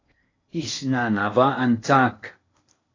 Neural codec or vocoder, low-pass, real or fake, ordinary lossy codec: codec, 16 kHz in and 24 kHz out, 0.8 kbps, FocalCodec, streaming, 65536 codes; 7.2 kHz; fake; AAC, 32 kbps